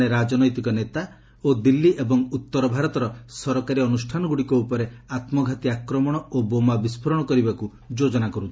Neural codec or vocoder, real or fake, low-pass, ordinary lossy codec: none; real; none; none